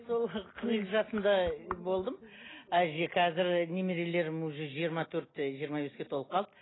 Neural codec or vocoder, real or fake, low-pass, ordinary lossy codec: none; real; 7.2 kHz; AAC, 16 kbps